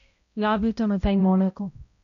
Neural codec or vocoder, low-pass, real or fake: codec, 16 kHz, 0.5 kbps, X-Codec, HuBERT features, trained on balanced general audio; 7.2 kHz; fake